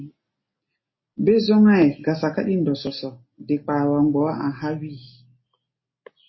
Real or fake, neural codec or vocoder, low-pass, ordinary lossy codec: real; none; 7.2 kHz; MP3, 24 kbps